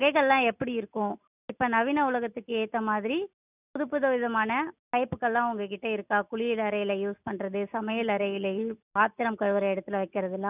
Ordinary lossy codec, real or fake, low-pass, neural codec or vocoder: none; real; 3.6 kHz; none